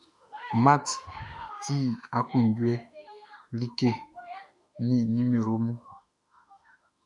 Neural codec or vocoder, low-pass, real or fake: autoencoder, 48 kHz, 32 numbers a frame, DAC-VAE, trained on Japanese speech; 10.8 kHz; fake